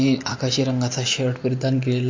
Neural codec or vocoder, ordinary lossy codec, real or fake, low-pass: none; MP3, 48 kbps; real; 7.2 kHz